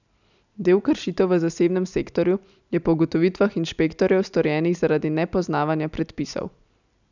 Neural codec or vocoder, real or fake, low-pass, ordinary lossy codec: none; real; 7.2 kHz; none